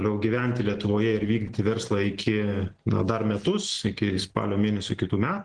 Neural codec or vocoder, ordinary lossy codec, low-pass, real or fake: none; Opus, 16 kbps; 9.9 kHz; real